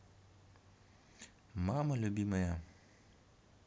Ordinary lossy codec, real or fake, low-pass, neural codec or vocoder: none; real; none; none